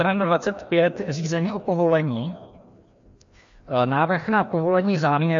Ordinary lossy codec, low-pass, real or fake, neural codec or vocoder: MP3, 48 kbps; 7.2 kHz; fake; codec, 16 kHz, 1 kbps, FreqCodec, larger model